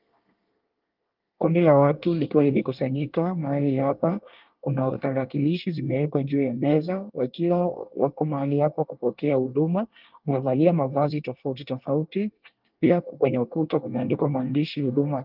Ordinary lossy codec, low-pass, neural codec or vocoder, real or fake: Opus, 32 kbps; 5.4 kHz; codec, 24 kHz, 1 kbps, SNAC; fake